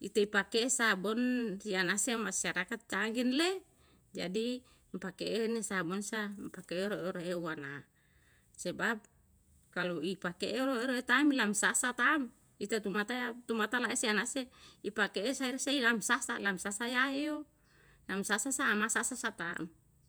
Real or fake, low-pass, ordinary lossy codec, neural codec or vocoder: fake; none; none; vocoder, 48 kHz, 128 mel bands, Vocos